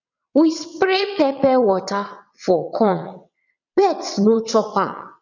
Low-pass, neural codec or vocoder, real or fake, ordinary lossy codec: 7.2 kHz; vocoder, 22.05 kHz, 80 mel bands, WaveNeXt; fake; none